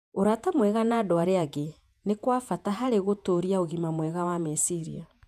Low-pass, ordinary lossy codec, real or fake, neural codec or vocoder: 14.4 kHz; none; fake; vocoder, 48 kHz, 128 mel bands, Vocos